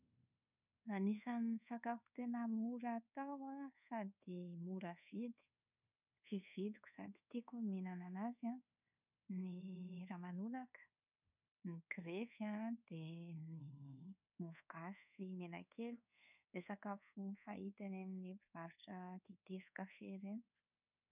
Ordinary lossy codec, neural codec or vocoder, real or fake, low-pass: none; codec, 24 kHz, 1.2 kbps, DualCodec; fake; 3.6 kHz